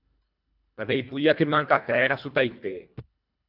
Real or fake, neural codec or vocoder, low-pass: fake; codec, 24 kHz, 1.5 kbps, HILCodec; 5.4 kHz